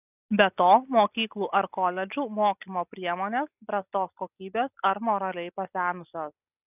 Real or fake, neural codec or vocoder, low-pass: real; none; 3.6 kHz